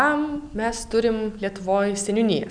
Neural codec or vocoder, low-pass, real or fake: none; 9.9 kHz; real